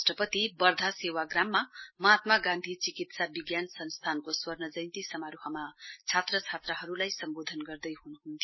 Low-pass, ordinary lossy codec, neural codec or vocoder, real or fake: 7.2 kHz; MP3, 24 kbps; none; real